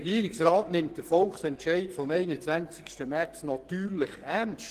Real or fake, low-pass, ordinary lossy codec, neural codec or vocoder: fake; 14.4 kHz; Opus, 16 kbps; codec, 44.1 kHz, 2.6 kbps, SNAC